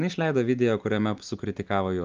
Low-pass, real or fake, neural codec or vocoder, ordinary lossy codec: 7.2 kHz; real; none; Opus, 32 kbps